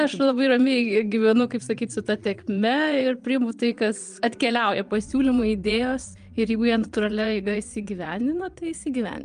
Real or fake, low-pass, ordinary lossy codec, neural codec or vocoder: fake; 9.9 kHz; Opus, 32 kbps; vocoder, 22.05 kHz, 80 mel bands, Vocos